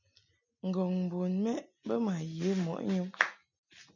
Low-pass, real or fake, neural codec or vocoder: 7.2 kHz; real; none